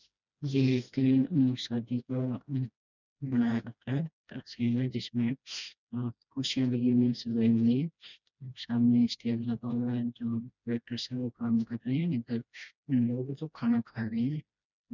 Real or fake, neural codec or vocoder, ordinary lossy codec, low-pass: fake; codec, 16 kHz, 1 kbps, FreqCodec, smaller model; none; 7.2 kHz